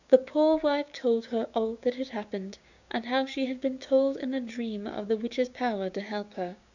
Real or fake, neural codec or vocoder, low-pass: fake; codec, 16 kHz, 6 kbps, DAC; 7.2 kHz